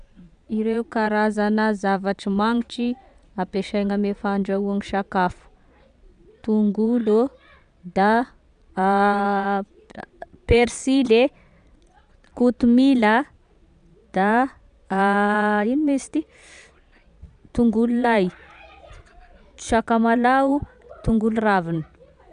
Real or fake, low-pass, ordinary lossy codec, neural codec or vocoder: fake; 9.9 kHz; none; vocoder, 22.05 kHz, 80 mel bands, Vocos